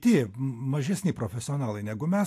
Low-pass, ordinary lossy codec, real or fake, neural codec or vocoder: 14.4 kHz; AAC, 64 kbps; real; none